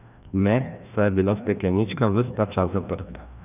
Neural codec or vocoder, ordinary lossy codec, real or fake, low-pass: codec, 16 kHz, 1 kbps, FreqCodec, larger model; none; fake; 3.6 kHz